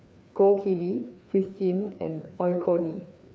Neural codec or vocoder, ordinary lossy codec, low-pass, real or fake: codec, 16 kHz, 2 kbps, FreqCodec, larger model; none; none; fake